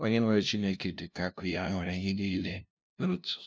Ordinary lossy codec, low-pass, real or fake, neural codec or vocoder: none; none; fake; codec, 16 kHz, 0.5 kbps, FunCodec, trained on LibriTTS, 25 frames a second